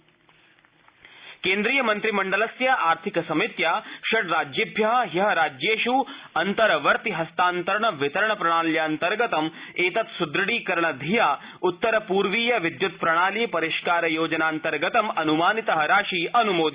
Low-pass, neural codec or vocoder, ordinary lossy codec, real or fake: 3.6 kHz; none; Opus, 64 kbps; real